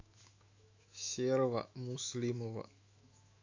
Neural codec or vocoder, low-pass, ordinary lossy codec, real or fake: autoencoder, 48 kHz, 128 numbers a frame, DAC-VAE, trained on Japanese speech; 7.2 kHz; AAC, 48 kbps; fake